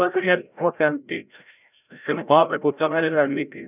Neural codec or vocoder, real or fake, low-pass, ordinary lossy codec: codec, 16 kHz, 0.5 kbps, FreqCodec, larger model; fake; 3.6 kHz; none